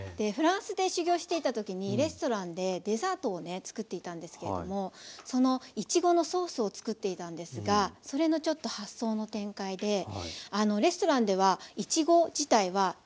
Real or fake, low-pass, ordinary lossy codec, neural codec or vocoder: real; none; none; none